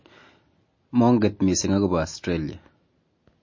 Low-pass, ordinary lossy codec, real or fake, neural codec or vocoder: 7.2 kHz; MP3, 32 kbps; real; none